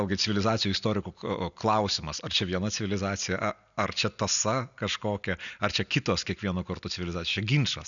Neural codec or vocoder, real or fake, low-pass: none; real; 7.2 kHz